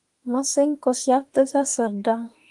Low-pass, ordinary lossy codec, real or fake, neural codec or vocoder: 10.8 kHz; Opus, 24 kbps; fake; autoencoder, 48 kHz, 32 numbers a frame, DAC-VAE, trained on Japanese speech